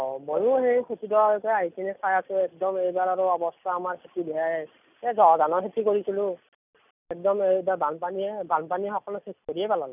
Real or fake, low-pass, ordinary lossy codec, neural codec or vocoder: real; 3.6 kHz; none; none